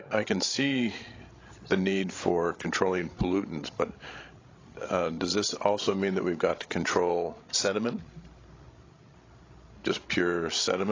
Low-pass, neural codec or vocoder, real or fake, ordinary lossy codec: 7.2 kHz; codec, 16 kHz, 16 kbps, FreqCodec, larger model; fake; AAC, 32 kbps